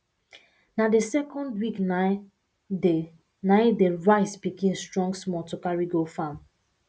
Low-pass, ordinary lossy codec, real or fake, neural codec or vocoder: none; none; real; none